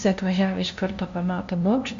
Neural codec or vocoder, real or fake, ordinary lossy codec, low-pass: codec, 16 kHz, 0.5 kbps, FunCodec, trained on LibriTTS, 25 frames a second; fake; MP3, 48 kbps; 7.2 kHz